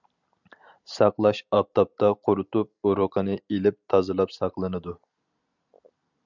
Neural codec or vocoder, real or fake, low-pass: none; real; 7.2 kHz